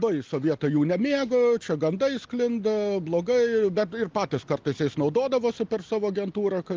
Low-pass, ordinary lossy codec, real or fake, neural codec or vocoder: 7.2 kHz; Opus, 24 kbps; real; none